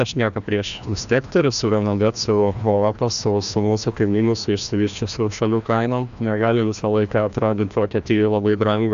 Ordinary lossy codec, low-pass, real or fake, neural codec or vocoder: AAC, 96 kbps; 7.2 kHz; fake; codec, 16 kHz, 1 kbps, FreqCodec, larger model